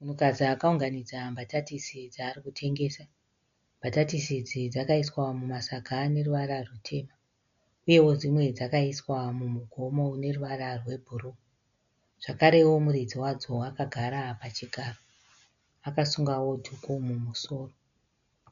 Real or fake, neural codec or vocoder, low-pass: real; none; 7.2 kHz